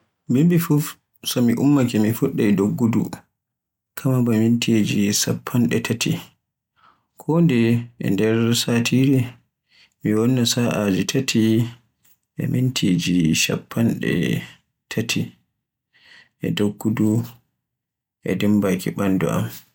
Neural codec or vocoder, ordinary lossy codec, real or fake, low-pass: none; none; real; 19.8 kHz